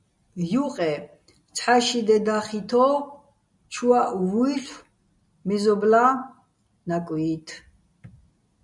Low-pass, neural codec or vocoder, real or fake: 10.8 kHz; none; real